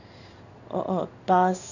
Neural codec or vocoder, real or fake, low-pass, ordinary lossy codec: codec, 16 kHz in and 24 kHz out, 1 kbps, XY-Tokenizer; fake; 7.2 kHz; none